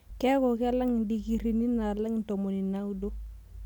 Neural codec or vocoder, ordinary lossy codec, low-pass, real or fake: none; none; 19.8 kHz; real